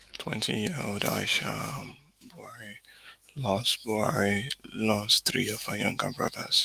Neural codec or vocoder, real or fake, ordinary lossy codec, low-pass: autoencoder, 48 kHz, 128 numbers a frame, DAC-VAE, trained on Japanese speech; fake; Opus, 32 kbps; 14.4 kHz